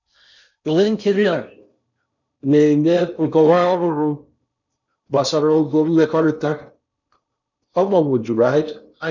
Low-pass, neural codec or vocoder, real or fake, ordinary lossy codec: 7.2 kHz; codec, 16 kHz in and 24 kHz out, 0.6 kbps, FocalCodec, streaming, 4096 codes; fake; none